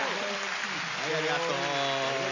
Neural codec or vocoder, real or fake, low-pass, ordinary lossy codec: none; real; 7.2 kHz; AAC, 48 kbps